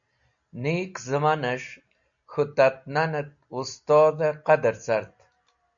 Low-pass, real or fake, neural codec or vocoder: 7.2 kHz; real; none